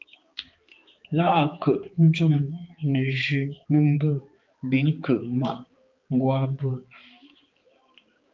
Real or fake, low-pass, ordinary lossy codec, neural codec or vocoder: fake; 7.2 kHz; Opus, 24 kbps; codec, 16 kHz, 4 kbps, X-Codec, HuBERT features, trained on balanced general audio